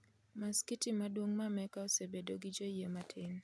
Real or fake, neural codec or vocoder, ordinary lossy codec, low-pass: real; none; none; none